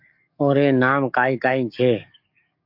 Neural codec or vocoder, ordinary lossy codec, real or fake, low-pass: codec, 44.1 kHz, 7.8 kbps, DAC; MP3, 48 kbps; fake; 5.4 kHz